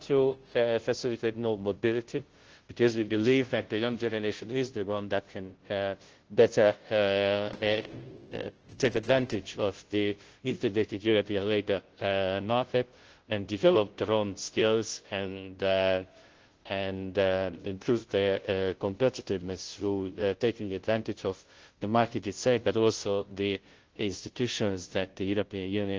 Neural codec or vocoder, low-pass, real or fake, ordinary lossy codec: codec, 16 kHz, 0.5 kbps, FunCodec, trained on Chinese and English, 25 frames a second; 7.2 kHz; fake; Opus, 16 kbps